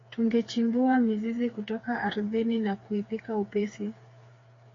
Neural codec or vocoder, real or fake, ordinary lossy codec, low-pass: codec, 16 kHz, 4 kbps, FreqCodec, smaller model; fake; AAC, 32 kbps; 7.2 kHz